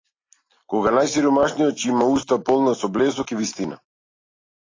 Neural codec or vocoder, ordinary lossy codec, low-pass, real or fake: none; AAC, 32 kbps; 7.2 kHz; real